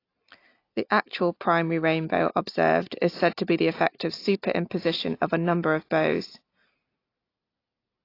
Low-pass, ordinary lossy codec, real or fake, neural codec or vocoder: 5.4 kHz; AAC, 32 kbps; real; none